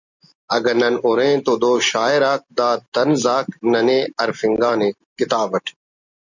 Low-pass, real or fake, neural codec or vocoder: 7.2 kHz; real; none